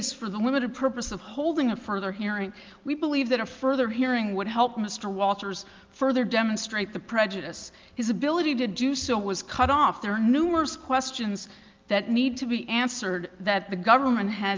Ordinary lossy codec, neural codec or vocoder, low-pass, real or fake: Opus, 24 kbps; none; 7.2 kHz; real